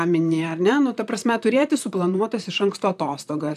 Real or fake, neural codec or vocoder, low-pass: fake; vocoder, 44.1 kHz, 128 mel bands, Pupu-Vocoder; 14.4 kHz